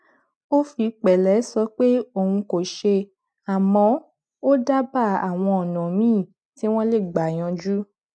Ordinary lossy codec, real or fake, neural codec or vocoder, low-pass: none; real; none; none